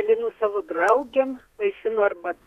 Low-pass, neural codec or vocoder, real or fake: 14.4 kHz; codec, 32 kHz, 1.9 kbps, SNAC; fake